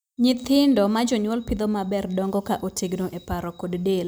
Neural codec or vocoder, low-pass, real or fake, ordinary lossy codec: none; none; real; none